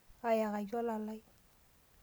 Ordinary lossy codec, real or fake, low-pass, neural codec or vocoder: none; real; none; none